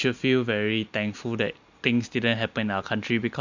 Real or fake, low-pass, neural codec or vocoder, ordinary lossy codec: real; 7.2 kHz; none; Opus, 64 kbps